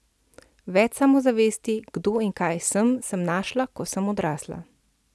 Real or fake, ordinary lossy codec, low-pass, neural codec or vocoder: real; none; none; none